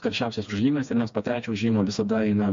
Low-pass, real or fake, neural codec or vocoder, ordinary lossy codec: 7.2 kHz; fake; codec, 16 kHz, 2 kbps, FreqCodec, smaller model; MP3, 48 kbps